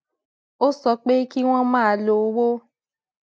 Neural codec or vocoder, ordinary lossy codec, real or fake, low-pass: none; none; real; none